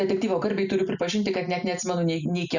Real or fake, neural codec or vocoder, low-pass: real; none; 7.2 kHz